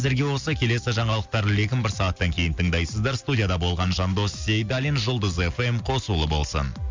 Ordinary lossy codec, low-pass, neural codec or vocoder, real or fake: MP3, 64 kbps; 7.2 kHz; none; real